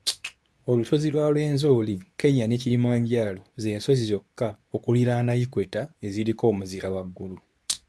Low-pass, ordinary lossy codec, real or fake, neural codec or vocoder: none; none; fake; codec, 24 kHz, 0.9 kbps, WavTokenizer, medium speech release version 2